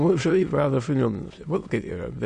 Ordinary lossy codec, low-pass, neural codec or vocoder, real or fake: MP3, 48 kbps; 9.9 kHz; autoencoder, 22.05 kHz, a latent of 192 numbers a frame, VITS, trained on many speakers; fake